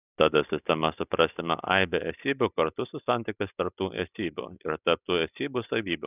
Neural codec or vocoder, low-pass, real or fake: none; 3.6 kHz; real